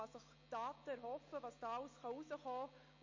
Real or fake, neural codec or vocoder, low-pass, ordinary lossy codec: real; none; 7.2 kHz; MP3, 32 kbps